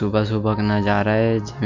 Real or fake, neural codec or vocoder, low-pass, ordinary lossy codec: real; none; 7.2 kHz; MP3, 64 kbps